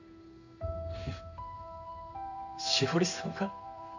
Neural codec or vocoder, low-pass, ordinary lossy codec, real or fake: codec, 16 kHz in and 24 kHz out, 1 kbps, XY-Tokenizer; 7.2 kHz; none; fake